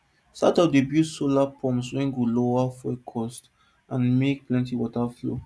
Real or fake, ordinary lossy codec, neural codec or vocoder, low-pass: real; none; none; none